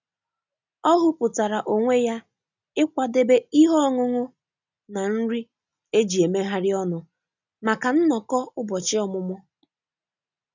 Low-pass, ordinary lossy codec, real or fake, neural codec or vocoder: 7.2 kHz; none; real; none